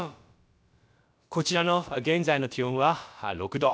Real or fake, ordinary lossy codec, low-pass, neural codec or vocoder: fake; none; none; codec, 16 kHz, about 1 kbps, DyCAST, with the encoder's durations